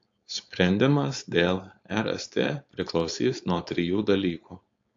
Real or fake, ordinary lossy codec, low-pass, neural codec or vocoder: fake; AAC, 48 kbps; 7.2 kHz; codec, 16 kHz, 4.8 kbps, FACodec